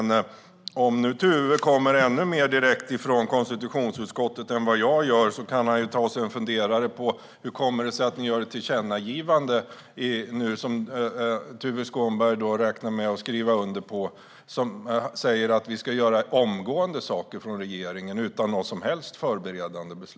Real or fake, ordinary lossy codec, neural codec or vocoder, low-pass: real; none; none; none